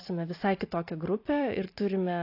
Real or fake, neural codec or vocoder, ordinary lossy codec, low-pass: fake; vocoder, 44.1 kHz, 128 mel bands every 512 samples, BigVGAN v2; AAC, 32 kbps; 5.4 kHz